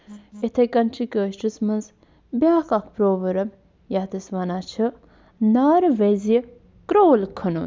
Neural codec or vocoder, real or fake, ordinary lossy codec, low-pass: none; real; none; none